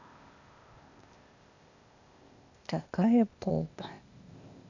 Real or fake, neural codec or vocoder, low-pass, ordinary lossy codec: fake; codec, 16 kHz, 0.8 kbps, ZipCodec; 7.2 kHz; none